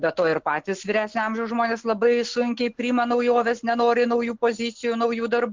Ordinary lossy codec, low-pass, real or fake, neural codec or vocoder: MP3, 64 kbps; 7.2 kHz; real; none